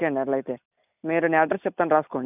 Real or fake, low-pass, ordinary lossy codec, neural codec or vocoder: real; 3.6 kHz; none; none